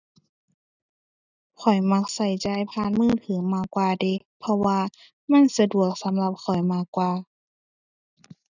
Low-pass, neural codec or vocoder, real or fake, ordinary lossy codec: 7.2 kHz; none; real; none